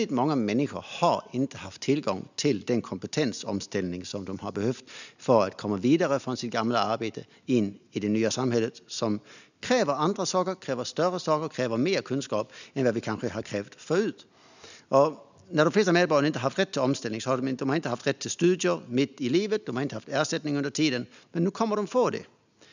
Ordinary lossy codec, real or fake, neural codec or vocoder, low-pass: none; real; none; 7.2 kHz